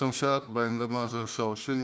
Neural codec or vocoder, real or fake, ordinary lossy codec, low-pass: codec, 16 kHz, 1 kbps, FunCodec, trained on Chinese and English, 50 frames a second; fake; none; none